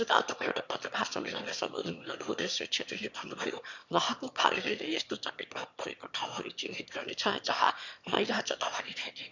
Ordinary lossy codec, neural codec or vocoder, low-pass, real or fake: none; autoencoder, 22.05 kHz, a latent of 192 numbers a frame, VITS, trained on one speaker; 7.2 kHz; fake